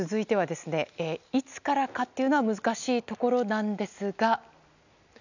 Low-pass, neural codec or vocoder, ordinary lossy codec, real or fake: 7.2 kHz; none; none; real